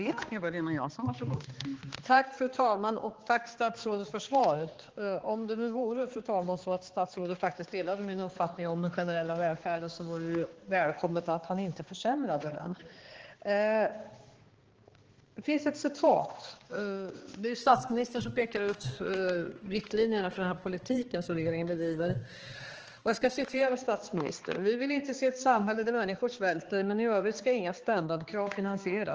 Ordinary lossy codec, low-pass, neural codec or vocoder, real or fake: Opus, 16 kbps; 7.2 kHz; codec, 16 kHz, 2 kbps, X-Codec, HuBERT features, trained on balanced general audio; fake